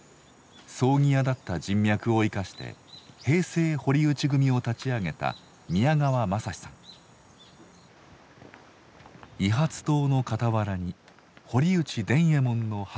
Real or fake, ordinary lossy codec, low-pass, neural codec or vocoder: real; none; none; none